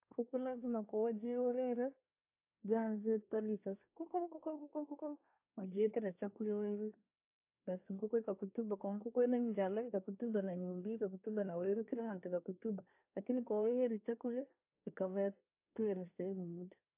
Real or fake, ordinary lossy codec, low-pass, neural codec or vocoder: fake; AAC, 32 kbps; 3.6 kHz; codec, 24 kHz, 1 kbps, SNAC